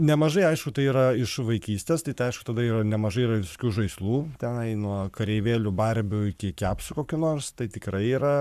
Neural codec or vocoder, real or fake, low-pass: codec, 44.1 kHz, 7.8 kbps, Pupu-Codec; fake; 14.4 kHz